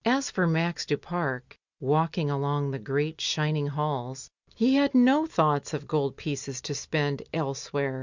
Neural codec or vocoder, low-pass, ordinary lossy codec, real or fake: none; 7.2 kHz; Opus, 64 kbps; real